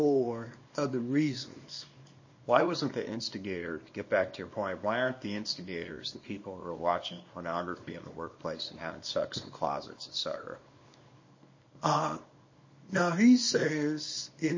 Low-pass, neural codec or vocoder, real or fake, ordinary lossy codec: 7.2 kHz; codec, 24 kHz, 0.9 kbps, WavTokenizer, small release; fake; MP3, 32 kbps